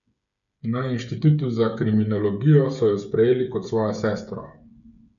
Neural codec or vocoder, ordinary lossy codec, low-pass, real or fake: codec, 16 kHz, 8 kbps, FreqCodec, smaller model; none; 7.2 kHz; fake